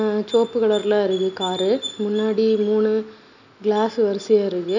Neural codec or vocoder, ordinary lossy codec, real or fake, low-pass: none; none; real; 7.2 kHz